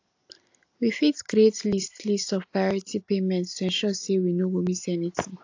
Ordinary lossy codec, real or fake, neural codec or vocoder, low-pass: AAC, 48 kbps; real; none; 7.2 kHz